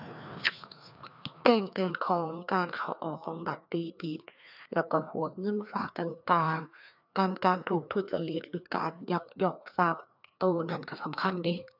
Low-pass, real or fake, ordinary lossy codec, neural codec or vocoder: 5.4 kHz; fake; none; codec, 16 kHz, 2 kbps, FreqCodec, larger model